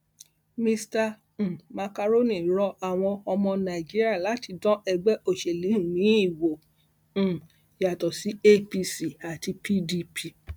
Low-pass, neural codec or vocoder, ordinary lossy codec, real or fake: none; none; none; real